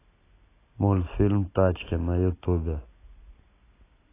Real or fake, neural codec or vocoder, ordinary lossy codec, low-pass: real; none; AAC, 16 kbps; 3.6 kHz